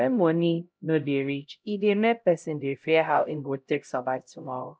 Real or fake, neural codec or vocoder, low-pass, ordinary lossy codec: fake; codec, 16 kHz, 0.5 kbps, X-Codec, HuBERT features, trained on LibriSpeech; none; none